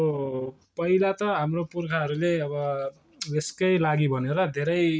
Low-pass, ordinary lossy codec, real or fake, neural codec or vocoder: none; none; real; none